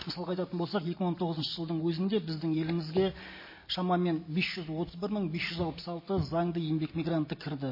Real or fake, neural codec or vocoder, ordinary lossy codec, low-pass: real; none; MP3, 24 kbps; 5.4 kHz